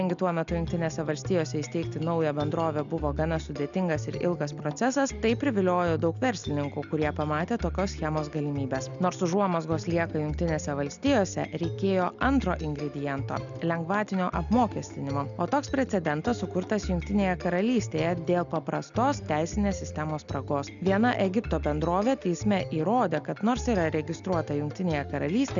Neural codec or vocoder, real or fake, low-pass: none; real; 7.2 kHz